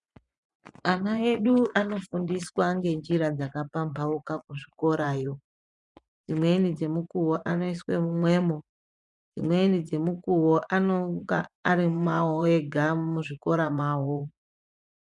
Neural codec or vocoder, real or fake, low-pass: vocoder, 24 kHz, 100 mel bands, Vocos; fake; 10.8 kHz